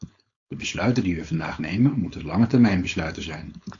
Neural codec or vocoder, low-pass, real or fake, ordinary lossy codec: codec, 16 kHz, 4.8 kbps, FACodec; 7.2 kHz; fake; AAC, 48 kbps